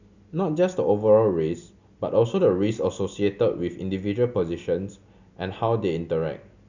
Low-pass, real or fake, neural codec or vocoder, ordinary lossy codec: 7.2 kHz; real; none; none